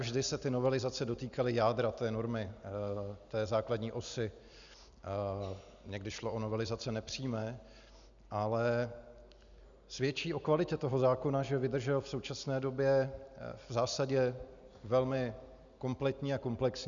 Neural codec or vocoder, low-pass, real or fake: none; 7.2 kHz; real